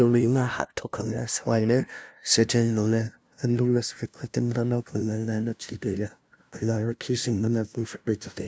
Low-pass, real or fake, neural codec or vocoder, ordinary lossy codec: none; fake; codec, 16 kHz, 0.5 kbps, FunCodec, trained on LibriTTS, 25 frames a second; none